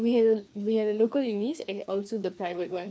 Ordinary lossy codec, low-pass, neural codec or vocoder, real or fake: none; none; codec, 16 kHz, 2 kbps, FreqCodec, larger model; fake